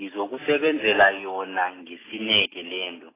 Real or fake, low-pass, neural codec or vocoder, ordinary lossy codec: real; 3.6 kHz; none; AAC, 16 kbps